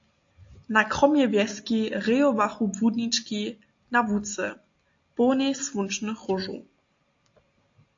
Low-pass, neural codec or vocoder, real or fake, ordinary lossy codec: 7.2 kHz; none; real; AAC, 48 kbps